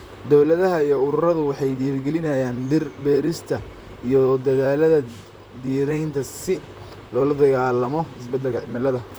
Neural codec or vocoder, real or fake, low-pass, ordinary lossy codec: vocoder, 44.1 kHz, 128 mel bands, Pupu-Vocoder; fake; none; none